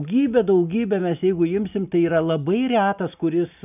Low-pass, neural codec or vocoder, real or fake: 3.6 kHz; none; real